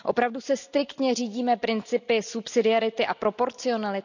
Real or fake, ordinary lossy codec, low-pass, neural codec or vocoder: real; none; 7.2 kHz; none